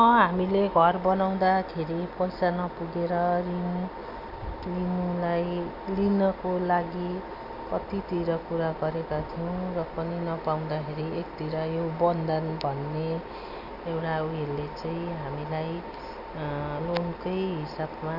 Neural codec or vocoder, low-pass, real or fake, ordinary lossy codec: none; 5.4 kHz; real; none